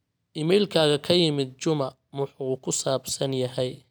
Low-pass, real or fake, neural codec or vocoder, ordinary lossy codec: none; real; none; none